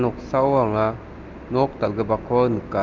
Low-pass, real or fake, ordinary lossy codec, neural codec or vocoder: 7.2 kHz; real; Opus, 24 kbps; none